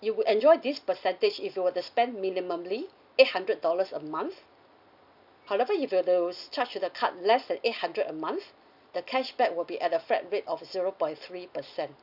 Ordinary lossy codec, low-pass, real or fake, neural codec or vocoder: none; 5.4 kHz; real; none